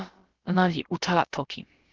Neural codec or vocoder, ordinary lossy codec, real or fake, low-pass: codec, 16 kHz, about 1 kbps, DyCAST, with the encoder's durations; Opus, 16 kbps; fake; 7.2 kHz